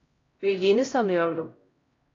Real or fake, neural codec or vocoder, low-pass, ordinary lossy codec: fake; codec, 16 kHz, 0.5 kbps, X-Codec, HuBERT features, trained on LibriSpeech; 7.2 kHz; AAC, 32 kbps